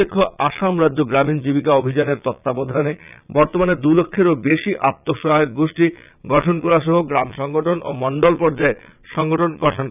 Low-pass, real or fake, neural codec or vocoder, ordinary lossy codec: 3.6 kHz; fake; vocoder, 22.05 kHz, 80 mel bands, Vocos; none